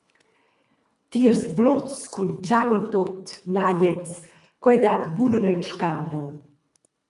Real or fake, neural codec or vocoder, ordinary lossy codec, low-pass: fake; codec, 24 kHz, 1.5 kbps, HILCodec; MP3, 96 kbps; 10.8 kHz